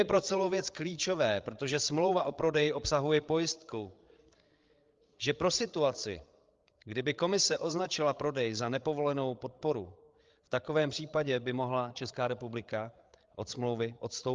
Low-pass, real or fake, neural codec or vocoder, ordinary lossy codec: 7.2 kHz; fake; codec, 16 kHz, 8 kbps, FreqCodec, larger model; Opus, 32 kbps